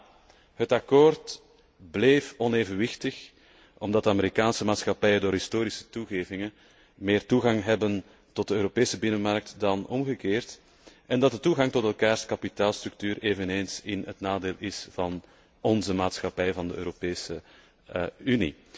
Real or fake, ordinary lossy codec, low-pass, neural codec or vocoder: real; none; none; none